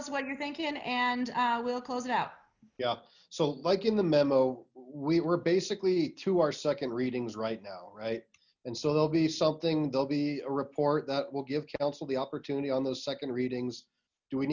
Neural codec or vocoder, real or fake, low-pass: none; real; 7.2 kHz